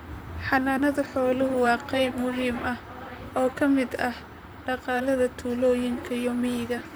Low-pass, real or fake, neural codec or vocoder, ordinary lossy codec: none; fake; vocoder, 44.1 kHz, 128 mel bands, Pupu-Vocoder; none